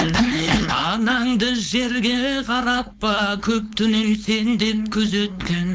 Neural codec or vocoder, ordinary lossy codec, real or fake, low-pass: codec, 16 kHz, 4.8 kbps, FACodec; none; fake; none